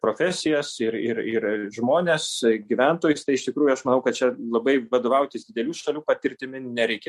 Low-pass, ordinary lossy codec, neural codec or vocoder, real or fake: 14.4 kHz; MP3, 64 kbps; none; real